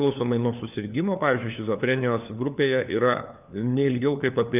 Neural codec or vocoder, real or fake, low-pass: codec, 16 kHz, 4 kbps, FunCodec, trained on LibriTTS, 50 frames a second; fake; 3.6 kHz